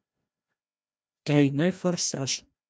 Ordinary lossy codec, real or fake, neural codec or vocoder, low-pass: none; fake; codec, 16 kHz, 1 kbps, FreqCodec, larger model; none